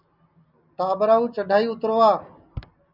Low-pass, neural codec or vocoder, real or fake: 5.4 kHz; none; real